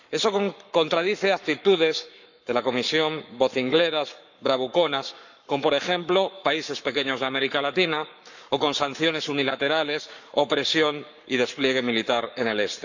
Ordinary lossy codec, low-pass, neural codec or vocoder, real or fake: none; 7.2 kHz; codec, 44.1 kHz, 7.8 kbps, Pupu-Codec; fake